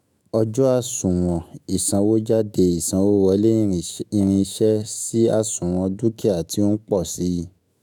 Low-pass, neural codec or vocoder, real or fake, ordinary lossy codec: none; autoencoder, 48 kHz, 128 numbers a frame, DAC-VAE, trained on Japanese speech; fake; none